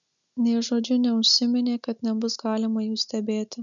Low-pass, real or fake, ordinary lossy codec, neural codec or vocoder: 7.2 kHz; real; MP3, 96 kbps; none